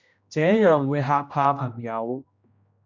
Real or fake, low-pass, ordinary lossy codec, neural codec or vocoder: fake; 7.2 kHz; MP3, 64 kbps; codec, 16 kHz, 1 kbps, X-Codec, HuBERT features, trained on general audio